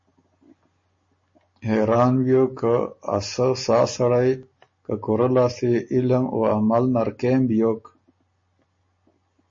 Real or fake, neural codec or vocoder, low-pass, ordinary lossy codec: real; none; 7.2 kHz; MP3, 32 kbps